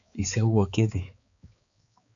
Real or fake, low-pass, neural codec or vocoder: fake; 7.2 kHz; codec, 16 kHz, 4 kbps, X-Codec, HuBERT features, trained on balanced general audio